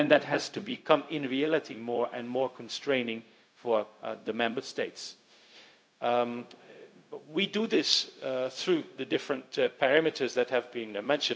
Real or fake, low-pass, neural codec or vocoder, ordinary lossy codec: fake; none; codec, 16 kHz, 0.4 kbps, LongCat-Audio-Codec; none